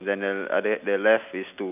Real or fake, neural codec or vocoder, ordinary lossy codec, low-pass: real; none; none; 3.6 kHz